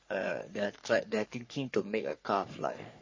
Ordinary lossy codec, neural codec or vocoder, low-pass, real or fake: MP3, 32 kbps; codec, 44.1 kHz, 3.4 kbps, Pupu-Codec; 7.2 kHz; fake